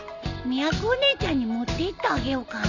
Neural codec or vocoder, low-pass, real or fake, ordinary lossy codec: none; 7.2 kHz; real; none